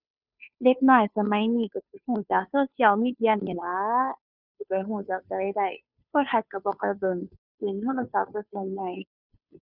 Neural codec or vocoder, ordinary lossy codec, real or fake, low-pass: codec, 16 kHz, 2 kbps, FunCodec, trained on Chinese and English, 25 frames a second; none; fake; 5.4 kHz